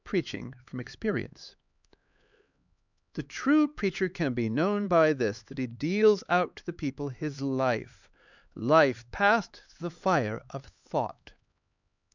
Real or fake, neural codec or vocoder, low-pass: fake; codec, 16 kHz, 4 kbps, X-Codec, HuBERT features, trained on LibriSpeech; 7.2 kHz